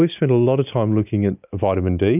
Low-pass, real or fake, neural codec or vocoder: 3.6 kHz; real; none